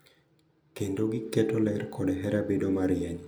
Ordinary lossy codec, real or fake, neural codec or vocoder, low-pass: none; real; none; none